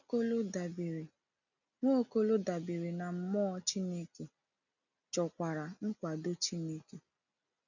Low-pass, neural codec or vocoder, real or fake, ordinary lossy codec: 7.2 kHz; none; real; none